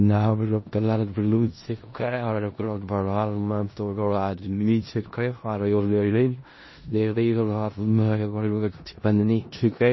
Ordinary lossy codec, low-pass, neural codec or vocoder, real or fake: MP3, 24 kbps; 7.2 kHz; codec, 16 kHz in and 24 kHz out, 0.4 kbps, LongCat-Audio-Codec, four codebook decoder; fake